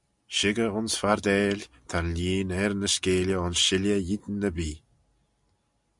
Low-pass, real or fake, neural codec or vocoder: 10.8 kHz; real; none